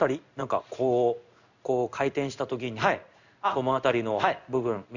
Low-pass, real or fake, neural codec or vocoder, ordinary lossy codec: 7.2 kHz; fake; codec, 16 kHz in and 24 kHz out, 1 kbps, XY-Tokenizer; none